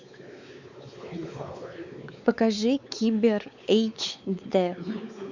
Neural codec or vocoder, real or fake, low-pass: codec, 16 kHz, 4 kbps, X-Codec, WavLM features, trained on Multilingual LibriSpeech; fake; 7.2 kHz